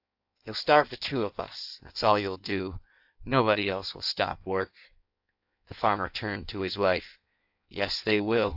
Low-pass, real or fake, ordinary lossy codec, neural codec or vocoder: 5.4 kHz; fake; AAC, 48 kbps; codec, 16 kHz in and 24 kHz out, 1.1 kbps, FireRedTTS-2 codec